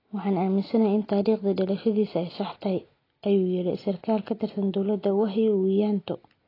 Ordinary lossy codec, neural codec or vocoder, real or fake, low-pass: AAC, 24 kbps; none; real; 5.4 kHz